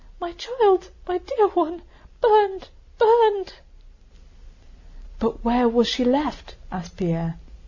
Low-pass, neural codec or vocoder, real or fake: 7.2 kHz; none; real